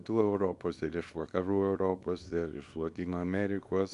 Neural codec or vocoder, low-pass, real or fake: codec, 24 kHz, 0.9 kbps, WavTokenizer, small release; 10.8 kHz; fake